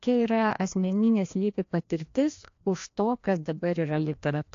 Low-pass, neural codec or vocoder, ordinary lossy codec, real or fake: 7.2 kHz; codec, 16 kHz, 1 kbps, FreqCodec, larger model; AAC, 48 kbps; fake